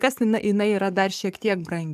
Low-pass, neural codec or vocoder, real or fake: 14.4 kHz; codec, 44.1 kHz, 7.8 kbps, DAC; fake